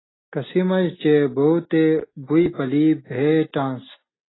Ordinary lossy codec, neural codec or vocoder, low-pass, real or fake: AAC, 16 kbps; none; 7.2 kHz; real